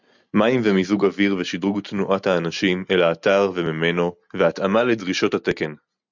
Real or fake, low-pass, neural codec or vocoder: real; 7.2 kHz; none